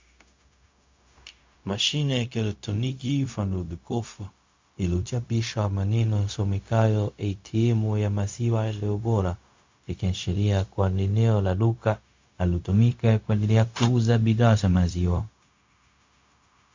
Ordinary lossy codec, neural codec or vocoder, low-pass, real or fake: MP3, 48 kbps; codec, 16 kHz, 0.4 kbps, LongCat-Audio-Codec; 7.2 kHz; fake